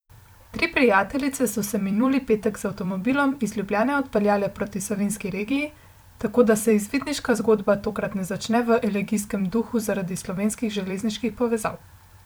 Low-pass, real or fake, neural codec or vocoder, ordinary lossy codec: none; fake; vocoder, 44.1 kHz, 128 mel bands every 256 samples, BigVGAN v2; none